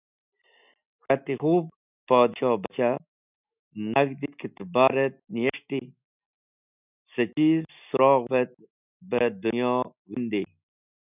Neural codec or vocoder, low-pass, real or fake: none; 3.6 kHz; real